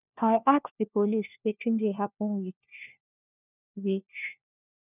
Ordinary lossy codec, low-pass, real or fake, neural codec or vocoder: none; 3.6 kHz; fake; codec, 16 kHz, 1 kbps, FunCodec, trained on LibriTTS, 50 frames a second